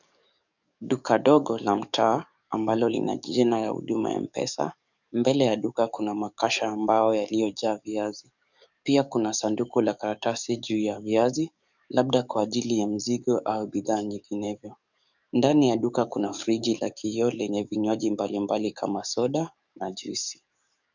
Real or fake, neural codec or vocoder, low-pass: fake; codec, 44.1 kHz, 7.8 kbps, DAC; 7.2 kHz